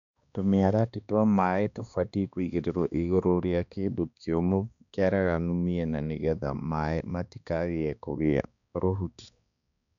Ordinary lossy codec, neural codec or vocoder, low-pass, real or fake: none; codec, 16 kHz, 2 kbps, X-Codec, HuBERT features, trained on balanced general audio; 7.2 kHz; fake